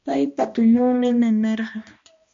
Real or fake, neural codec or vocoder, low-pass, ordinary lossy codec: fake; codec, 16 kHz, 1 kbps, X-Codec, HuBERT features, trained on balanced general audio; 7.2 kHz; MP3, 48 kbps